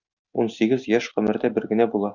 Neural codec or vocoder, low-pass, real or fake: none; 7.2 kHz; real